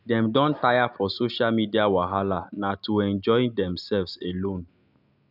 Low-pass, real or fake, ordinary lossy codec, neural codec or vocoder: 5.4 kHz; real; none; none